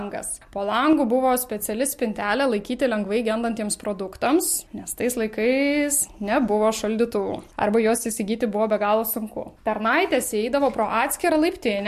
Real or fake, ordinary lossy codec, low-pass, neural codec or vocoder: real; MP3, 64 kbps; 14.4 kHz; none